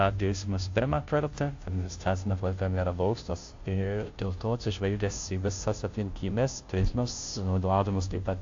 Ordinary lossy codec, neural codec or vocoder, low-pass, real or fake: AAC, 48 kbps; codec, 16 kHz, 0.5 kbps, FunCodec, trained on Chinese and English, 25 frames a second; 7.2 kHz; fake